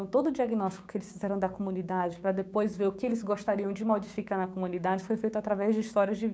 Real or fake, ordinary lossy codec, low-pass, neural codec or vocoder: fake; none; none; codec, 16 kHz, 6 kbps, DAC